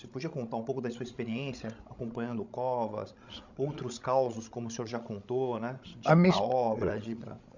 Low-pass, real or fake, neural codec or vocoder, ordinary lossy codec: 7.2 kHz; fake; codec, 16 kHz, 16 kbps, FreqCodec, larger model; none